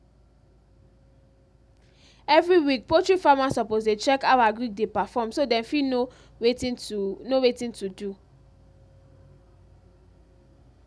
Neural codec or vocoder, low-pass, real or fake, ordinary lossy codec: none; none; real; none